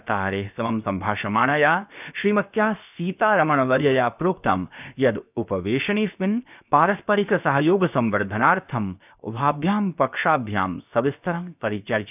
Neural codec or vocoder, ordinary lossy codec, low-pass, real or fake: codec, 16 kHz, 0.7 kbps, FocalCodec; none; 3.6 kHz; fake